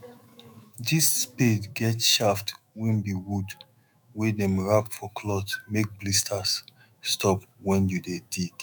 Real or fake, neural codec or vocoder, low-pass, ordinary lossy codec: fake; autoencoder, 48 kHz, 128 numbers a frame, DAC-VAE, trained on Japanese speech; none; none